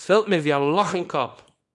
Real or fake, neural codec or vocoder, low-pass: fake; codec, 24 kHz, 0.9 kbps, WavTokenizer, small release; 10.8 kHz